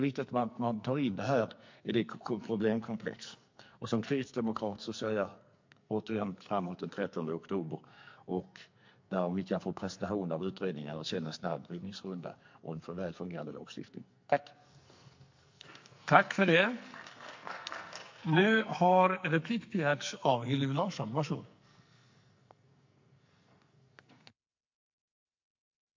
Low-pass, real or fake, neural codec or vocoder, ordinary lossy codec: 7.2 kHz; fake; codec, 44.1 kHz, 2.6 kbps, SNAC; MP3, 48 kbps